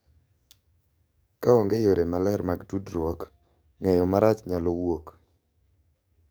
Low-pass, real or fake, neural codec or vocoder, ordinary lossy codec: none; fake; codec, 44.1 kHz, 7.8 kbps, DAC; none